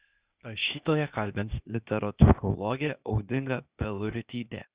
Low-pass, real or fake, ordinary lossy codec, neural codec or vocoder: 3.6 kHz; fake; Opus, 64 kbps; codec, 16 kHz, 0.8 kbps, ZipCodec